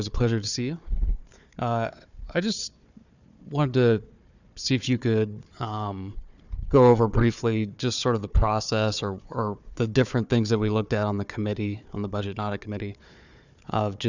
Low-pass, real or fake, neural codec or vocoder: 7.2 kHz; fake; codec, 16 kHz, 4 kbps, FunCodec, trained on Chinese and English, 50 frames a second